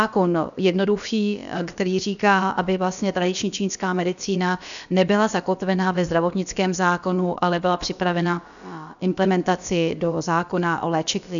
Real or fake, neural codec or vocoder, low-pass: fake; codec, 16 kHz, about 1 kbps, DyCAST, with the encoder's durations; 7.2 kHz